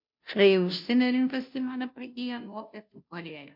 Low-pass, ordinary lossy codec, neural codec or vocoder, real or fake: 5.4 kHz; MP3, 48 kbps; codec, 16 kHz, 0.5 kbps, FunCodec, trained on Chinese and English, 25 frames a second; fake